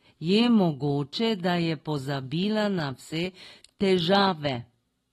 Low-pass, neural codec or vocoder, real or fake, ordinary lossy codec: 19.8 kHz; none; real; AAC, 32 kbps